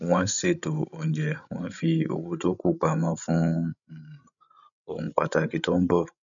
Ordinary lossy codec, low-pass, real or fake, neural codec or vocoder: none; 7.2 kHz; fake; codec, 16 kHz, 16 kbps, FreqCodec, larger model